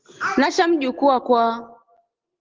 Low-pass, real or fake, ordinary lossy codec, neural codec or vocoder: 7.2 kHz; real; Opus, 16 kbps; none